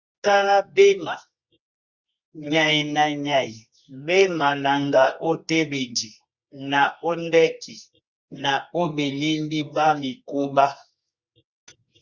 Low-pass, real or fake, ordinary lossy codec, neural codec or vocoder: 7.2 kHz; fake; Opus, 64 kbps; codec, 24 kHz, 0.9 kbps, WavTokenizer, medium music audio release